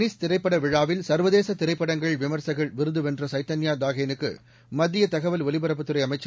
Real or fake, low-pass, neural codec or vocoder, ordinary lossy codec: real; none; none; none